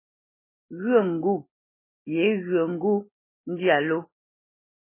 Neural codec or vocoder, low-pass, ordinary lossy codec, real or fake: none; 3.6 kHz; MP3, 16 kbps; real